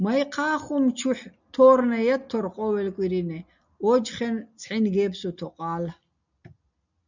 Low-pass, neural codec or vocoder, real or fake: 7.2 kHz; none; real